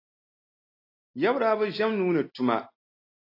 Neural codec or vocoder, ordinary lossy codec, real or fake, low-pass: none; AAC, 32 kbps; real; 5.4 kHz